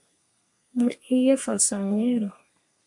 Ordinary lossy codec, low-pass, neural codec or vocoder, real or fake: MP3, 64 kbps; 10.8 kHz; codec, 32 kHz, 1.9 kbps, SNAC; fake